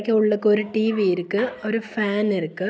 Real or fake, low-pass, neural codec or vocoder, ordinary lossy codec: real; none; none; none